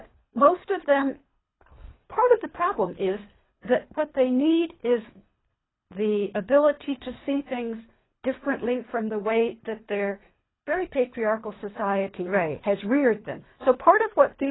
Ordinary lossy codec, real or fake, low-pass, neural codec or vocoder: AAC, 16 kbps; fake; 7.2 kHz; codec, 24 kHz, 3 kbps, HILCodec